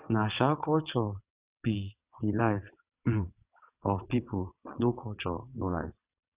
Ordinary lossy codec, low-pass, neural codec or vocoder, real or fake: Opus, 64 kbps; 3.6 kHz; vocoder, 22.05 kHz, 80 mel bands, WaveNeXt; fake